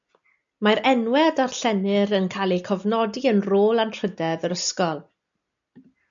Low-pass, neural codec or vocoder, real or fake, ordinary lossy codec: 7.2 kHz; none; real; MP3, 96 kbps